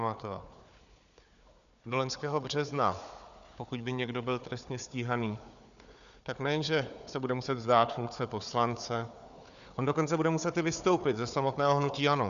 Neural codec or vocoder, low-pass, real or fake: codec, 16 kHz, 4 kbps, FunCodec, trained on Chinese and English, 50 frames a second; 7.2 kHz; fake